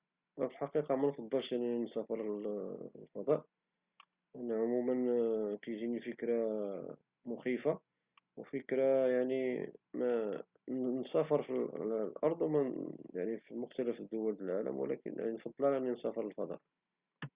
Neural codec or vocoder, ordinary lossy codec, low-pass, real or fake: none; Opus, 64 kbps; 3.6 kHz; real